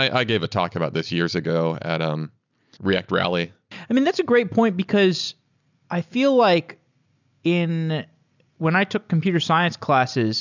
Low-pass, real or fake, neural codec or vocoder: 7.2 kHz; real; none